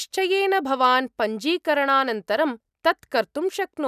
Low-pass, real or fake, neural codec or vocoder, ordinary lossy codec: 14.4 kHz; real; none; none